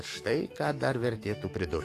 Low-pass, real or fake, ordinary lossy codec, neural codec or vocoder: 14.4 kHz; fake; AAC, 48 kbps; codec, 44.1 kHz, 7.8 kbps, DAC